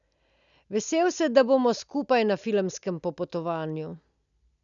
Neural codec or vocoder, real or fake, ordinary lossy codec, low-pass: none; real; none; 7.2 kHz